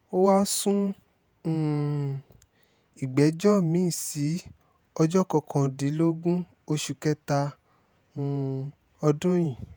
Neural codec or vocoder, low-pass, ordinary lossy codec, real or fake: vocoder, 48 kHz, 128 mel bands, Vocos; none; none; fake